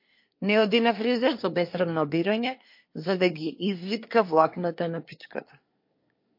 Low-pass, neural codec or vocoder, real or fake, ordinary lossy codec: 5.4 kHz; codec, 24 kHz, 1 kbps, SNAC; fake; MP3, 32 kbps